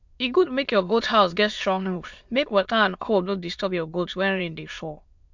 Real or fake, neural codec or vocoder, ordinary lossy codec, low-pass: fake; autoencoder, 22.05 kHz, a latent of 192 numbers a frame, VITS, trained on many speakers; MP3, 64 kbps; 7.2 kHz